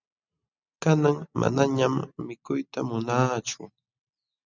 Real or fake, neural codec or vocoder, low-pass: real; none; 7.2 kHz